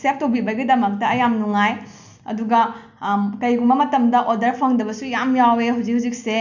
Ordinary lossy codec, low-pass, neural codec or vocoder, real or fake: none; 7.2 kHz; none; real